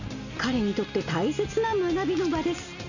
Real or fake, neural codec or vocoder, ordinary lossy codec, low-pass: real; none; none; 7.2 kHz